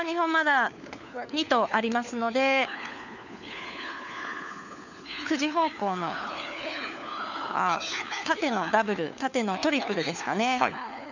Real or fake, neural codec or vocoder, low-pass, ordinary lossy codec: fake; codec, 16 kHz, 4 kbps, FunCodec, trained on LibriTTS, 50 frames a second; 7.2 kHz; none